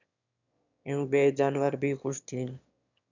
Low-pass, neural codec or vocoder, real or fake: 7.2 kHz; autoencoder, 22.05 kHz, a latent of 192 numbers a frame, VITS, trained on one speaker; fake